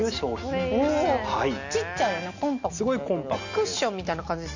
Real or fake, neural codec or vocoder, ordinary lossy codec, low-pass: real; none; none; 7.2 kHz